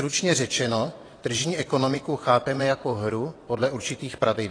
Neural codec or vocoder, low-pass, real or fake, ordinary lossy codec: vocoder, 22.05 kHz, 80 mel bands, WaveNeXt; 9.9 kHz; fake; AAC, 32 kbps